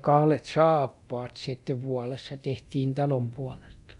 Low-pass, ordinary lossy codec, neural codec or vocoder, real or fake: 10.8 kHz; none; codec, 24 kHz, 0.9 kbps, DualCodec; fake